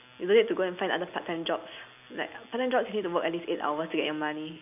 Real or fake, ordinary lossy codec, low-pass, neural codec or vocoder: real; none; 3.6 kHz; none